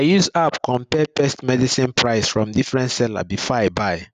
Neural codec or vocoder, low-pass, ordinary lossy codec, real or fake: none; 9.9 kHz; none; real